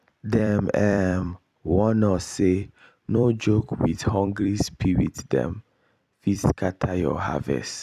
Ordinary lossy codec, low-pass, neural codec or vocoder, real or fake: none; 14.4 kHz; vocoder, 44.1 kHz, 128 mel bands every 256 samples, BigVGAN v2; fake